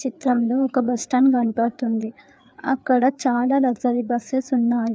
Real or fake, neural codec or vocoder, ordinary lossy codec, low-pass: fake; codec, 16 kHz, 4 kbps, FreqCodec, larger model; none; none